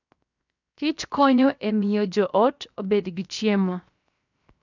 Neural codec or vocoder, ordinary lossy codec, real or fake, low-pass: codec, 16 kHz, 0.7 kbps, FocalCodec; none; fake; 7.2 kHz